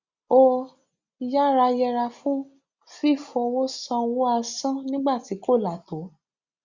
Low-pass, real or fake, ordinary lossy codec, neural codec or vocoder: 7.2 kHz; real; Opus, 64 kbps; none